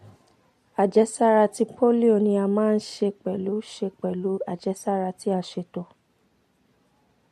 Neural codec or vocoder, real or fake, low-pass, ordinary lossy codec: none; real; 14.4 kHz; MP3, 64 kbps